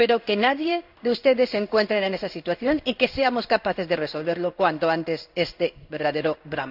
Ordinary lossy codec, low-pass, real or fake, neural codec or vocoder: none; 5.4 kHz; fake; codec, 16 kHz in and 24 kHz out, 1 kbps, XY-Tokenizer